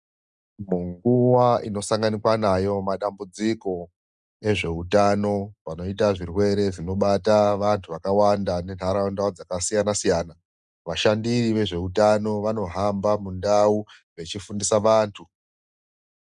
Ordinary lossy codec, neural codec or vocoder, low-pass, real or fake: Opus, 64 kbps; none; 10.8 kHz; real